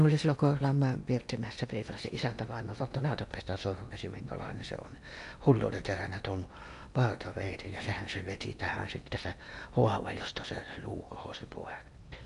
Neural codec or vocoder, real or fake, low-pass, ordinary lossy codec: codec, 16 kHz in and 24 kHz out, 0.8 kbps, FocalCodec, streaming, 65536 codes; fake; 10.8 kHz; none